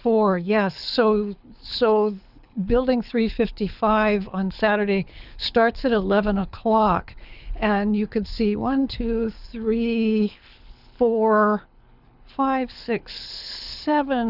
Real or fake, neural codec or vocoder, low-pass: fake; codec, 24 kHz, 6 kbps, HILCodec; 5.4 kHz